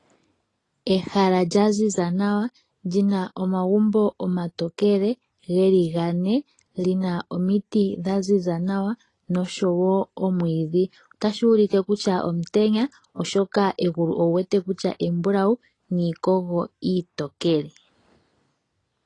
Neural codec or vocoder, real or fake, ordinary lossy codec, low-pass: none; real; AAC, 32 kbps; 10.8 kHz